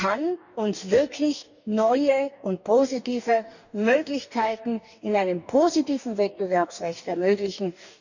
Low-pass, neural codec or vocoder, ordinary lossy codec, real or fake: 7.2 kHz; codec, 44.1 kHz, 2.6 kbps, DAC; none; fake